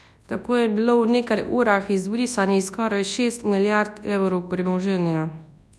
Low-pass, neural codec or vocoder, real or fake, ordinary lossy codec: none; codec, 24 kHz, 0.9 kbps, WavTokenizer, large speech release; fake; none